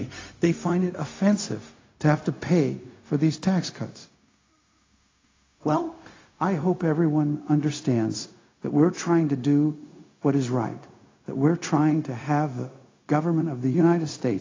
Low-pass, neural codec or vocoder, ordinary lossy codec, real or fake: 7.2 kHz; codec, 16 kHz, 0.4 kbps, LongCat-Audio-Codec; AAC, 32 kbps; fake